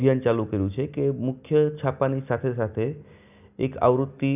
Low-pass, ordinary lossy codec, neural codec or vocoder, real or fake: 3.6 kHz; none; none; real